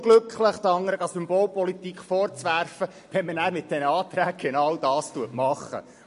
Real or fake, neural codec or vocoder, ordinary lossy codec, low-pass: real; none; MP3, 48 kbps; 10.8 kHz